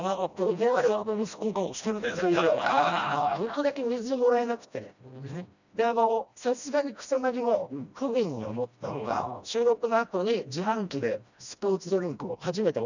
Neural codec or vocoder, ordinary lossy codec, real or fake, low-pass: codec, 16 kHz, 1 kbps, FreqCodec, smaller model; none; fake; 7.2 kHz